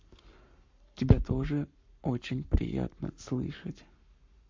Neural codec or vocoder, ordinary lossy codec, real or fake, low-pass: codec, 44.1 kHz, 7.8 kbps, Pupu-Codec; MP3, 48 kbps; fake; 7.2 kHz